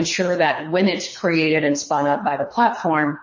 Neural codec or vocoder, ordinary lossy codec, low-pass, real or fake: codec, 24 kHz, 3 kbps, HILCodec; MP3, 32 kbps; 7.2 kHz; fake